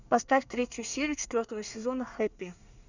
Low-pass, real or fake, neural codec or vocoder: 7.2 kHz; fake; codec, 24 kHz, 1 kbps, SNAC